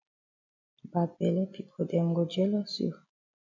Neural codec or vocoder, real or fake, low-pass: none; real; 7.2 kHz